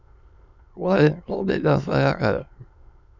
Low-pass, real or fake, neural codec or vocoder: 7.2 kHz; fake; autoencoder, 22.05 kHz, a latent of 192 numbers a frame, VITS, trained on many speakers